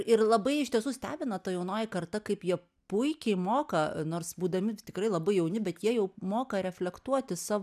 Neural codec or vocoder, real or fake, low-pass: none; real; 14.4 kHz